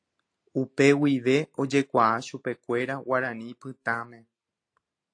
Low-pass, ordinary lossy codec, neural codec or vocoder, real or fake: 9.9 kHz; AAC, 48 kbps; none; real